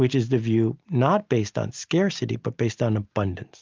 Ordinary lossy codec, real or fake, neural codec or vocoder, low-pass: Opus, 24 kbps; real; none; 7.2 kHz